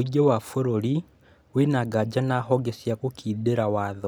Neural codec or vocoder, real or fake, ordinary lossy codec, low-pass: none; real; none; none